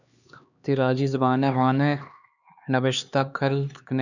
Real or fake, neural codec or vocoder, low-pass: fake; codec, 16 kHz, 2 kbps, X-Codec, HuBERT features, trained on LibriSpeech; 7.2 kHz